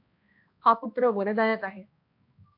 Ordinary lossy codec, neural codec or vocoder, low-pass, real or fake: AAC, 32 kbps; codec, 16 kHz, 1 kbps, X-Codec, HuBERT features, trained on balanced general audio; 5.4 kHz; fake